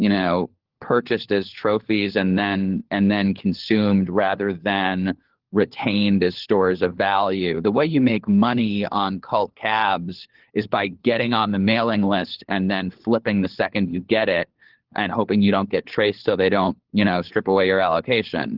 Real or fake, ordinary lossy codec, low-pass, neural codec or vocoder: fake; Opus, 16 kbps; 5.4 kHz; codec, 16 kHz, 4 kbps, FunCodec, trained on LibriTTS, 50 frames a second